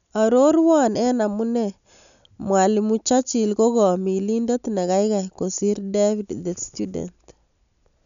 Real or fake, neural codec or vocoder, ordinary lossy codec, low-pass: real; none; none; 7.2 kHz